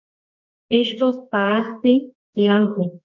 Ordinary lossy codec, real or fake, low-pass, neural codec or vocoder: MP3, 64 kbps; fake; 7.2 kHz; codec, 24 kHz, 0.9 kbps, WavTokenizer, medium music audio release